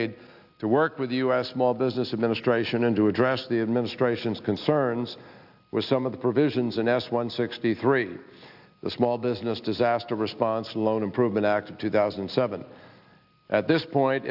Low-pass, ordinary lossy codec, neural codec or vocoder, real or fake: 5.4 kHz; AAC, 48 kbps; none; real